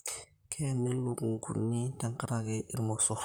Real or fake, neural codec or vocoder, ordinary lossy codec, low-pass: fake; vocoder, 44.1 kHz, 128 mel bands, Pupu-Vocoder; none; none